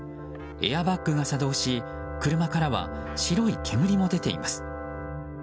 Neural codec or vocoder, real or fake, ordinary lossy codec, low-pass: none; real; none; none